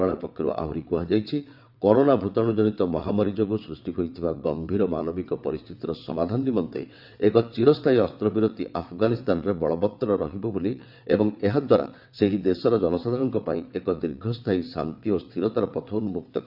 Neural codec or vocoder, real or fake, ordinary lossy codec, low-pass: vocoder, 22.05 kHz, 80 mel bands, WaveNeXt; fake; none; 5.4 kHz